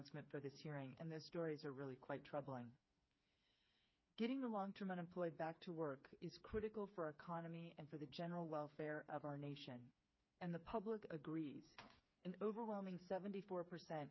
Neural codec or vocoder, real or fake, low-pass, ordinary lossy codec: codec, 16 kHz, 4 kbps, FreqCodec, smaller model; fake; 7.2 kHz; MP3, 24 kbps